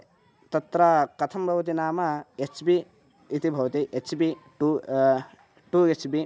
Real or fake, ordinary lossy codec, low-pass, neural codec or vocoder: real; none; none; none